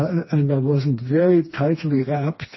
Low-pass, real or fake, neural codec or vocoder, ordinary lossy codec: 7.2 kHz; fake; codec, 16 kHz, 2 kbps, FreqCodec, smaller model; MP3, 24 kbps